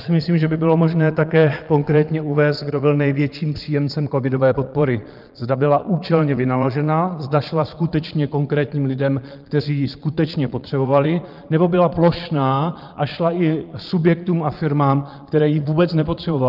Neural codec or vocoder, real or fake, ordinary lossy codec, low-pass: vocoder, 22.05 kHz, 80 mel bands, Vocos; fake; Opus, 24 kbps; 5.4 kHz